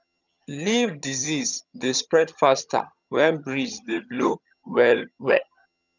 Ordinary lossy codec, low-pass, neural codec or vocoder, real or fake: none; 7.2 kHz; vocoder, 22.05 kHz, 80 mel bands, HiFi-GAN; fake